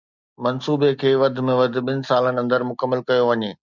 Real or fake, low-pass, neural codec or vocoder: real; 7.2 kHz; none